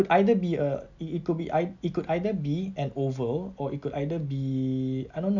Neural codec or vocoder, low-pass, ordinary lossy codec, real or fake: none; 7.2 kHz; none; real